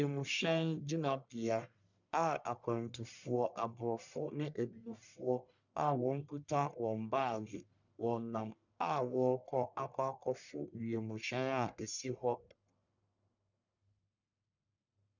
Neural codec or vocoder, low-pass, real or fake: codec, 44.1 kHz, 1.7 kbps, Pupu-Codec; 7.2 kHz; fake